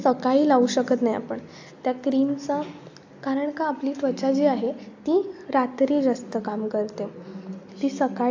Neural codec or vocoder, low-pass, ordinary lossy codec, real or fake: none; 7.2 kHz; AAC, 48 kbps; real